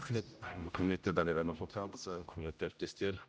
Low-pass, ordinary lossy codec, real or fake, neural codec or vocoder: none; none; fake; codec, 16 kHz, 0.5 kbps, X-Codec, HuBERT features, trained on general audio